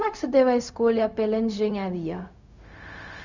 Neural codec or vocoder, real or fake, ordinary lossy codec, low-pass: codec, 16 kHz, 0.4 kbps, LongCat-Audio-Codec; fake; none; 7.2 kHz